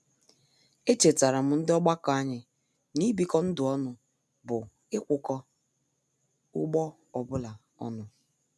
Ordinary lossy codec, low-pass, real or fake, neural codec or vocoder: none; none; real; none